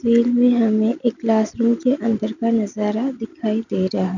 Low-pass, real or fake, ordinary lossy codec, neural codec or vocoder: 7.2 kHz; real; none; none